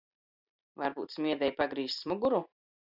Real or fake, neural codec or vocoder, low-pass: fake; vocoder, 44.1 kHz, 128 mel bands every 256 samples, BigVGAN v2; 5.4 kHz